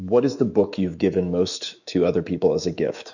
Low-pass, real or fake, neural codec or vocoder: 7.2 kHz; fake; autoencoder, 48 kHz, 128 numbers a frame, DAC-VAE, trained on Japanese speech